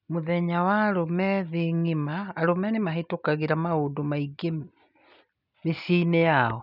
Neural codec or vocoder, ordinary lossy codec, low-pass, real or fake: none; none; 5.4 kHz; real